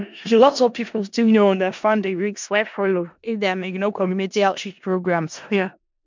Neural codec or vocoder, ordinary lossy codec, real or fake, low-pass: codec, 16 kHz in and 24 kHz out, 0.4 kbps, LongCat-Audio-Codec, four codebook decoder; MP3, 64 kbps; fake; 7.2 kHz